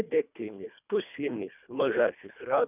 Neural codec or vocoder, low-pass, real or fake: codec, 24 kHz, 1.5 kbps, HILCodec; 3.6 kHz; fake